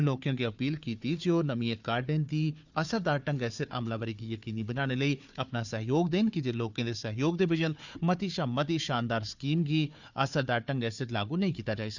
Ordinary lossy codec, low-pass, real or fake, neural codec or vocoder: none; 7.2 kHz; fake; codec, 16 kHz, 4 kbps, FunCodec, trained on Chinese and English, 50 frames a second